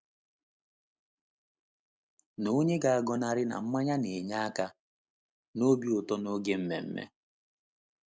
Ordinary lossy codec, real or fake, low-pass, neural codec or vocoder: none; real; none; none